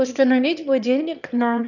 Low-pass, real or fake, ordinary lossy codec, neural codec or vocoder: 7.2 kHz; fake; none; autoencoder, 22.05 kHz, a latent of 192 numbers a frame, VITS, trained on one speaker